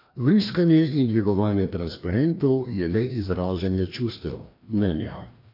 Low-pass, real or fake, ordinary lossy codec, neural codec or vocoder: 5.4 kHz; fake; AAC, 32 kbps; codec, 16 kHz, 1 kbps, FreqCodec, larger model